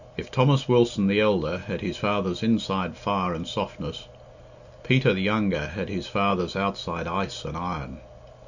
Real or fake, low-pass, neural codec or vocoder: real; 7.2 kHz; none